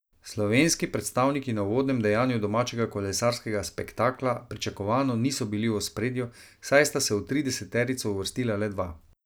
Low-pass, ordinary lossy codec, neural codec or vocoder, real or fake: none; none; none; real